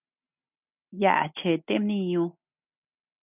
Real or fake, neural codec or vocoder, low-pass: real; none; 3.6 kHz